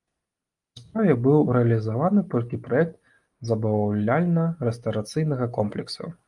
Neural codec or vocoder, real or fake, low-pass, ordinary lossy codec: none; real; 10.8 kHz; Opus, 32 kbps